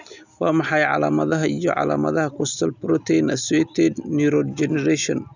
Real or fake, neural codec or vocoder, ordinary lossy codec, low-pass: real; none; none; 7.2 kHz